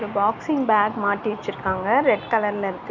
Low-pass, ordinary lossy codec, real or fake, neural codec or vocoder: 7.2 kHz; none; real; none